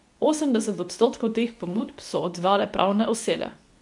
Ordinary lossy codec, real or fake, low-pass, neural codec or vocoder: MP3, 64 kbps; fake; 10.8 kHz; codec, 24 kHz, 0.9 kbps, WavTokenizer, medium speech release version 2